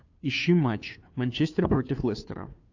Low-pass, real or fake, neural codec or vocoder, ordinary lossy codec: 7.2 kHz; fake; codec, 16 kHz, 2 kbps, FunCodec, trained on Chinese and English, 25 frames a second; AAC, 48 kbps